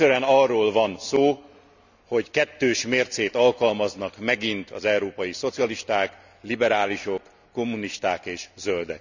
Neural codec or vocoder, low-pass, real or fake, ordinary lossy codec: none; 7.2 kHz; real; none